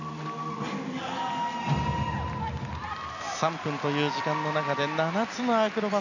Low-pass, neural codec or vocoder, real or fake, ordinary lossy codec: 7.2 kHz; none; real; none